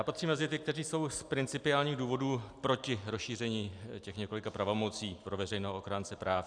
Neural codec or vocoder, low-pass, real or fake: none; 9.9 kHz; real